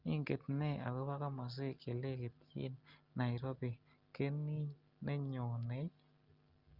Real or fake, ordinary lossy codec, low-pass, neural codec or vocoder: real; Opus, 32 kbps; 5.4 kHz; none